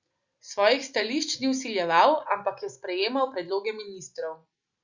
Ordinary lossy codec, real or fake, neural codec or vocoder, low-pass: Opus, 64 kbps; real; none; 7.2 kHz